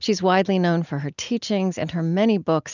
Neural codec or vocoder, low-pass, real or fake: none; 7.2 kHz; real